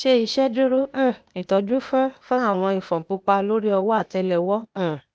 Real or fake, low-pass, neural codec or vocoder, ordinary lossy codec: fake; none; codec, 16 kHz, 0.8 kbps, ZipCodec; none